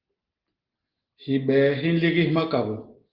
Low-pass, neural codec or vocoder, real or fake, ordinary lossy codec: 5.4 kHz; none; real; Opus, 16 kbps